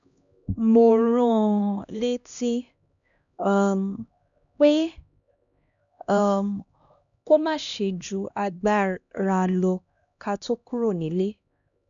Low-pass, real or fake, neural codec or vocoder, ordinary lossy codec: 7.2 kHz; fake; codec, 16 kHz, 1 kbps, X-Codec, HuBERT features, trained on LibriSpeech; none